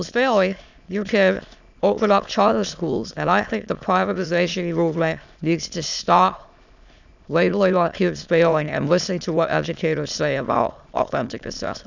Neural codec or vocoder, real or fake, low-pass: autoencoder, 22.05 kHz, a latent of 192 numbers a frame, VITS, trained on many speakers; fake; 7.2 kHz